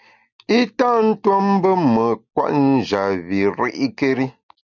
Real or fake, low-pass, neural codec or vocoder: real; 7.2 kHz; none